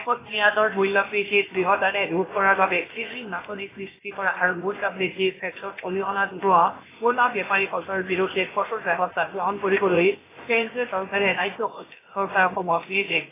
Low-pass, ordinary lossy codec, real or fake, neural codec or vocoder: 3.6 kHz; AAC, 16 kbps; fake; codec, 16 kHz, about 1 kbps, DyCAST, with the encoder's durations